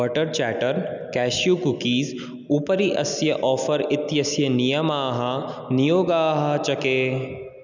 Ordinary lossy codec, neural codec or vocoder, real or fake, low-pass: none; none; real; 7.2 kHz